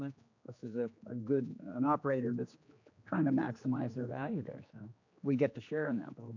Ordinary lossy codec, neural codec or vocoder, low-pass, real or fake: AAC, 48 kbps; codec, 16 kHz, 2 kbps, X-Codec, HuBERT features, trained on general audio; 7.2 kHz; fake